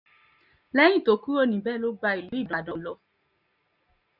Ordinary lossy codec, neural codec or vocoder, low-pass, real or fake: none; none; 5.4 kHz; real